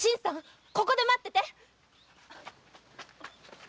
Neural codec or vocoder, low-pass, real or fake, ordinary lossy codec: none; none; real; none